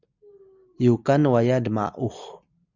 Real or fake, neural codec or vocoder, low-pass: real; none; 7.2 kHz